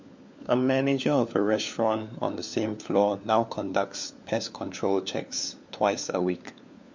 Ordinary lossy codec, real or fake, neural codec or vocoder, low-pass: MP3, 48 kbps; fake; codec, 16 kHz, 4 kbps, FunCodec, trained on LibriTTS, 50 frames a second; 7.2 kHz